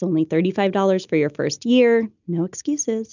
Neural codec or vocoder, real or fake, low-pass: none; real; 7.2 kHz